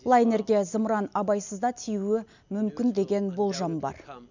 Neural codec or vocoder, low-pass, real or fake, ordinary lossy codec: none; 7.2 kHz; real; none